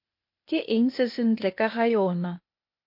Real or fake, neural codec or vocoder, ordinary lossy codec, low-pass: fake; codec, 16 kHz, 0.8 kbps, ZipCodec; MP3, 32 kbps; 5.4 kHz